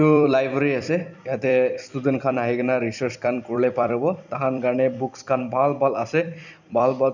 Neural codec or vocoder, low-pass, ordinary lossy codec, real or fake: vocoder, 44.1 kHz, 128 mel bands every 256 samples, BigVGAN v2; 7.2 kHz; none; fake